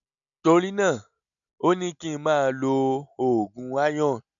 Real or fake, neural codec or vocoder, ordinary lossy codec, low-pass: real; none; none; 7.2 kHz